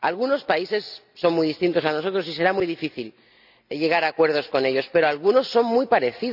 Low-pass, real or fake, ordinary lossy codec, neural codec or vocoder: 5.4 kHz; real; none; none